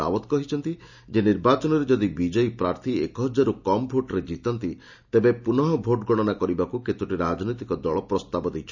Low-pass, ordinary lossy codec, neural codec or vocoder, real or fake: 7.2 kHz; none; none; real